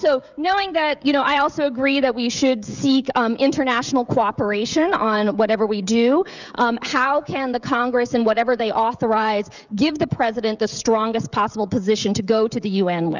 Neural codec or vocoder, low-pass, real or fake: codec, 16 kHz, 16 kbps, FreqCodec, smaller model; 7.2 kHz; fake